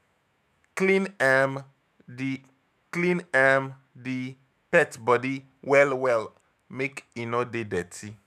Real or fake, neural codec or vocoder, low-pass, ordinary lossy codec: fake; autoencoder, 48 kHz, 128 numbers a frame, DAC-VAE, trained on Japanese speech; 14.4 kHz; none